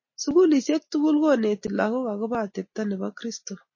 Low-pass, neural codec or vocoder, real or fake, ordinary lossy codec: 7.2 kHz; none; real; MP3, 32 kbps